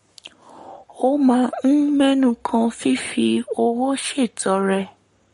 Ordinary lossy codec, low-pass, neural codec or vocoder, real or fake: MP3, 48 kbps; 19.8 kHz; codec, 44.1 kHz, 7.8 kbps, Pupu-Codec; fake